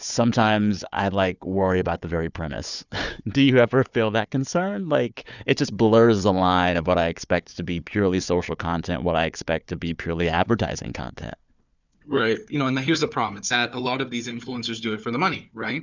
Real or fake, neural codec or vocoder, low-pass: fake; codec, 16 kHz, 4 kbps, FunCodec, trained on Chinese and English, 50 frames a second; 7.2 kHz